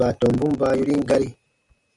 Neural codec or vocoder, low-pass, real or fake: none; 10.8 kHz; real